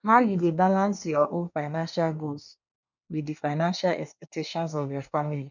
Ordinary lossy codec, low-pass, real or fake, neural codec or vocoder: none; 7.2 kHz; fake; codec, 24 kHz, 1 kbps, SNAC